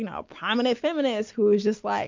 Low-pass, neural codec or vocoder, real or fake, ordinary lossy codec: 7.2 kHz; none; real; MP3, 48 kbps